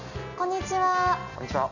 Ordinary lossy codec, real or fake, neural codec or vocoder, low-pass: none; real; none; 7.2 kHz